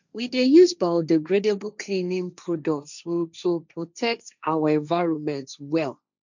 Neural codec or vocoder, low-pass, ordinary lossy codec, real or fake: codec, 16 kHz, 1.1 kbps, Voila-Tokenizer; 7.2 kHz; none; fake